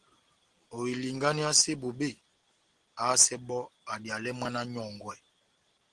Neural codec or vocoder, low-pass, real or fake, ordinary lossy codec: none; 9.9 kHz; real; Opus, 16 kbps